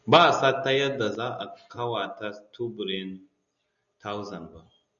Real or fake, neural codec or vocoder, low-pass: real; none; 7.2 kHz